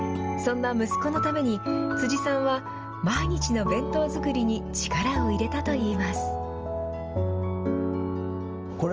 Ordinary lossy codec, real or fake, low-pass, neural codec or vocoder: Opus, 24 kbps; real; 7.2 kHz; none